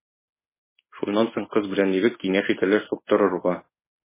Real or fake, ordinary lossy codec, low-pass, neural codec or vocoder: fake; MP3, 16 kbps; 3.6 kHz; codec, 16 kHz in and 24 kHz out, 1 kbps, XY-Tokenizer